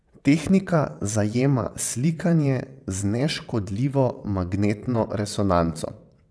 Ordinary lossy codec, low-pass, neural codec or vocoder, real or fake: none; none; vocoder, 22.05 kHz, 80 mel bands, WaveNeXt; fake